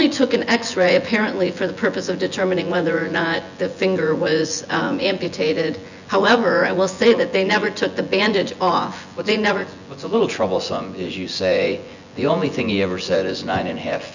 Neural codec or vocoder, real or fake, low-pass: vocoder, 24 kHz, 100 mel bands, Vocos; fake; 7.2 kHz